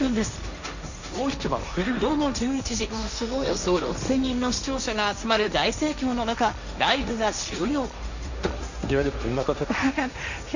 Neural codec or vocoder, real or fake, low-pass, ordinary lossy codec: codec, 16 kHz, 1.1 kbps, Voila-Tokenizer; fake; 7.2 kHz; none